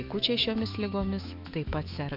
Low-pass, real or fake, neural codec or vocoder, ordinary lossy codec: 5.4 kHz; fake; autoencoder, 48 kHz, 128 numbers a frame, DAC-VAE, trained on Japanese speech; MP3, 48 kbps